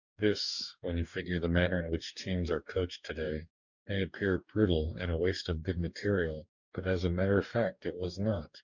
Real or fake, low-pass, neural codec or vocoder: fake; 7.2 kHz; codec, 44.1 kHz, 2.6 kbps, DAC